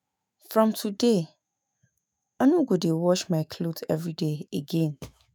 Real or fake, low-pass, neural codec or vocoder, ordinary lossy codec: fake; none; autoencoder, 48 kHz, 128 numbers a frame, DAC-VAE, trained on Japanese speech; none